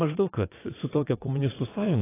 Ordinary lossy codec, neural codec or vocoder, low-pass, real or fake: AAC, 16 kbps; vocoder, 22.05 kHz, 80 mel bands, WaveNeXt; 3.6 kHz; fake